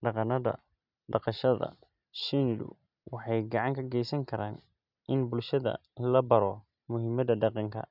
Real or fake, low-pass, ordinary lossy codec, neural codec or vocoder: real; 5.4 kHz; none; none